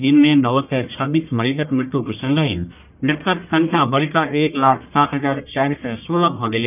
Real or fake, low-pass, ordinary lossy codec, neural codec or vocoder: fake; 3.6 kHz; none; codec, 44.1 kHz, 1.7 kbps, Pupu-Codec